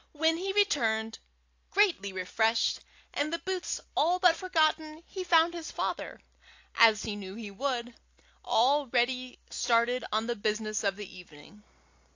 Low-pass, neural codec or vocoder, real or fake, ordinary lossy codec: 7.2 kHz; none; real; AAC, 48 kbps